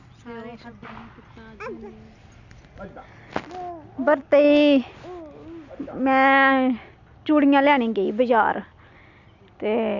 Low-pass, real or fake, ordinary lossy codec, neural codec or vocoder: 7.2 kHz; real; none; none